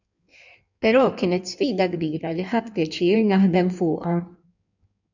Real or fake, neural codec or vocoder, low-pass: fake; codec, 16 kHz in and 24 kHz out, 1.1 kbps, FireRedTTS-2 codec; 7.2 kHz